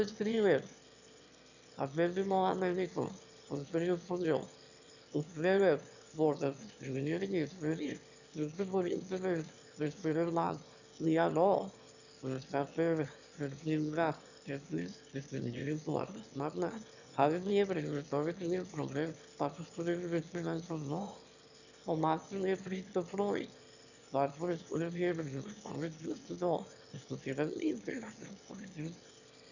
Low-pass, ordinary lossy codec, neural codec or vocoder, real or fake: 7.2 kHz; none; autoencoder, 22.05 kHz, a latent of 192 numbers a frame, VITS, trained on one speaker; fake